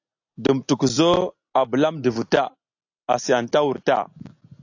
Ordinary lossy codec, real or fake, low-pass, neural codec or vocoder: AAC, 48 kbps; real; 7.2 kHz; none